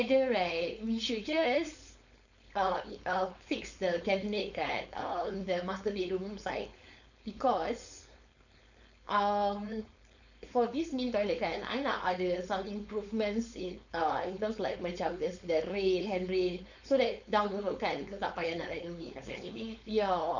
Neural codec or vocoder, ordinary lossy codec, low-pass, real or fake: codec, 16 kHz, 4.8 kbps, FACodec; none; 7.2 kHz; fake